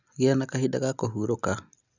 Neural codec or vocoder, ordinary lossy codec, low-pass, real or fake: none; none; 7.2 kHz; real